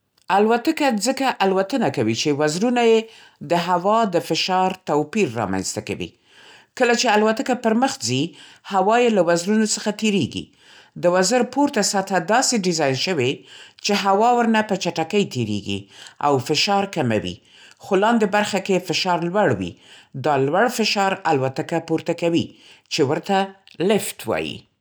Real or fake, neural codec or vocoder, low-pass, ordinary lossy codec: real; none; none; none